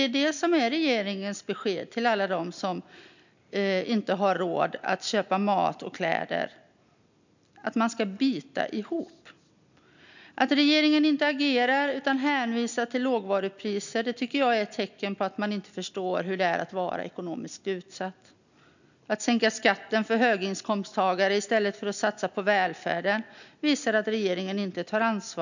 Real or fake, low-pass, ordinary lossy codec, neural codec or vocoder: real; 7.2 kHz; none; none